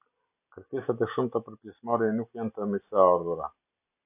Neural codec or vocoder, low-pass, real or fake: none; 3.6 kHz; real